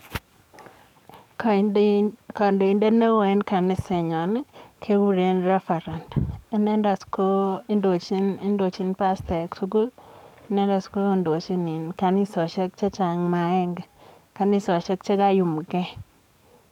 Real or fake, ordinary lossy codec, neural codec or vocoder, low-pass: fake; none; codec, 44.1 kHz, 7.8 kbps, DAC; 19.8 kHz